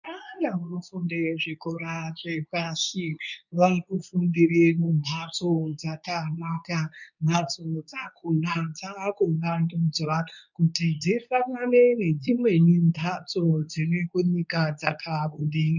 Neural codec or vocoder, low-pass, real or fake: codec, 24 kHz, 0.9 kbps, WavTokenizer, medium speech release version 2; 7.2 kHz; fake